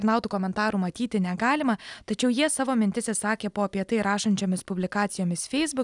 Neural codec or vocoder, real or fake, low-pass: none; real; 10.8 kHz